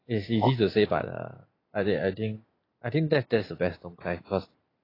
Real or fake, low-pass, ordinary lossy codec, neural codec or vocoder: real; 5.4 kHz; AAC, 24 kbps; none